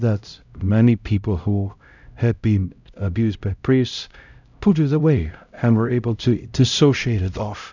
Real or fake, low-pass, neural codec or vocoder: fake; 7.2 kHz; codec, 16 kHz, 0.5 kbps, X-Codec, HuBERT features, trained on LibriSpeech